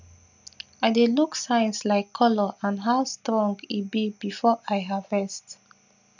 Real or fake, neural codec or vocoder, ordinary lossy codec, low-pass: real; none; none; 7.2 kHz